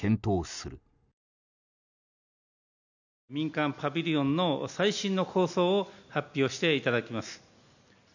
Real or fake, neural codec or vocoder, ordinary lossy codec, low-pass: real; none; none; 7.2 kHz